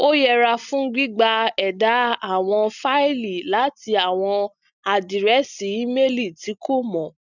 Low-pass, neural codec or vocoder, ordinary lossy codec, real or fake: 7.2 kHz; none; none; real